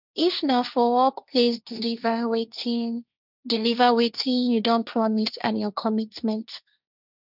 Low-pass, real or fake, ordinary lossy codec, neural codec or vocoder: 5.4 kHz; fake; none; codec, 16 kHz, 1.1 kbps, Voila-Tokenizer